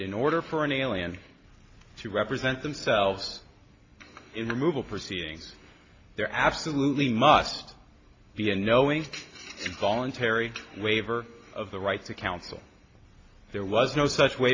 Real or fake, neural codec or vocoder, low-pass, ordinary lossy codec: real; none; 7.2 kHz; AAC, 32 kbps